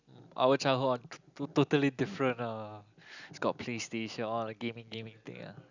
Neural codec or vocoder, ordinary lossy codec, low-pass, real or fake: none; none; 7.2 kHz; real